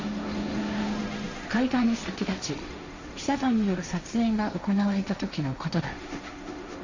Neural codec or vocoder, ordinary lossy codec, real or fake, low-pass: codec, 16 kHz, 1.1 kbps, Voila-Tokenizer; Opus, 64 kbps; fake; 7.2 kHz